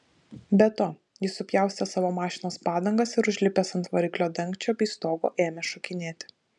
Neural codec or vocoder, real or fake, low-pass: none; real; 10.8 kHz